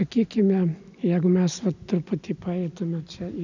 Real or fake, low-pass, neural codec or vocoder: real; 7.2 kHz; none